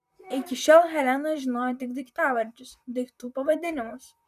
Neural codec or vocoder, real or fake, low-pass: vocoder, 44.1 kHz, 128 mel bands, Pupu-Vocoder; fake; 14.4 kHz